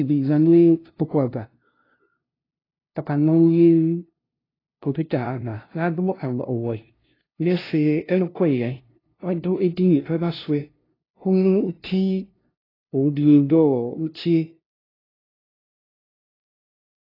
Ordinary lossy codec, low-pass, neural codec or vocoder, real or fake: AAC, 24 kbps; 5.4 kHz; codec, 16 kHz, 0.5 kbps, FunCodec, trained on LibriTTS, 25 frames a second; fake